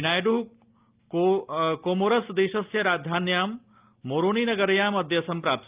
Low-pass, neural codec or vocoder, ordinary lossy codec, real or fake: 3.6 kHz; none; Opus, 32 kbps; real